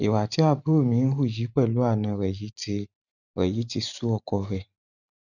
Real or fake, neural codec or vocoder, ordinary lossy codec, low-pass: fake; vocoder, 44.1 kHz, 128 mel bands every 512 samples, BigVGAN v2; none; 7.2 kHz